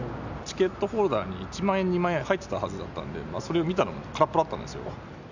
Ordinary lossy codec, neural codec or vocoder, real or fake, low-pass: none; none; real; 7.2 kHz